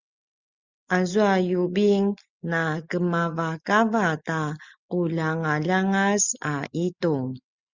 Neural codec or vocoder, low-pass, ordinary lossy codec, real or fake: none; 7.2 kHz; Opus, 64 kbps; real